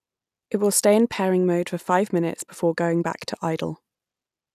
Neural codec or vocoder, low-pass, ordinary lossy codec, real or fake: none; 14.4 kHz; none; real